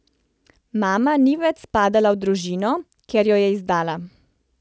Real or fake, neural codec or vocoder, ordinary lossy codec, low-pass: real; none; none; none